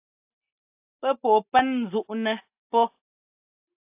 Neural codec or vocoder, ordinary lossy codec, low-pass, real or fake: none; AAC, 32 kbps; 3.6 kHz; real